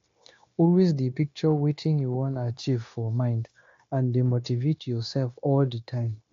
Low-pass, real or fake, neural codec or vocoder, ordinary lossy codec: 7.2 kHz; fake; codec, 16 kHz, 0.9 kbps, LongCat-Audio-Codec; AAC, 48 kbps